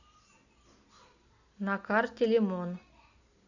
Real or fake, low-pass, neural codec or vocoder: real; 7.2 kHz; none